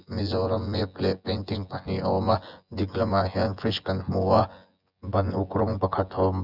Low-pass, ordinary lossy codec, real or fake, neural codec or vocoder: 5.4 kHz; Opus, 64 kbps; fake; vocoder, 24 kHz, 100 mel bands, Vocos